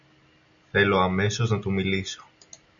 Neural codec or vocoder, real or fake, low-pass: none; real; 7.2 kHz